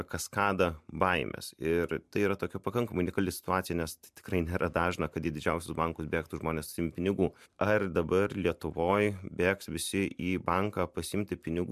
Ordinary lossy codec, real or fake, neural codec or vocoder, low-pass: MP3, 96 kbps; fake; vocoder, 44.1 kHz, 128 mel bands every 512 samples, BigVGAN v2; 14.4 kHz